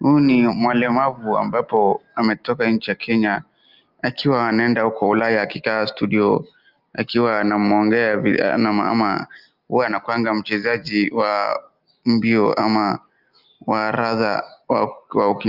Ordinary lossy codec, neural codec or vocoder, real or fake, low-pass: Opus, 32 kbps; none; real; 5.4 kHz